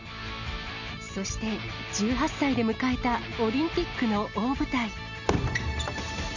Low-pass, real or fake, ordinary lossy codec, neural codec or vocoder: 7.2 kHz; real; none; none